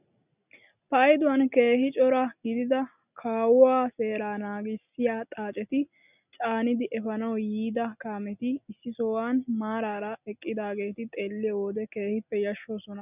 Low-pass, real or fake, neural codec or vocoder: 3.6 kHz; real; none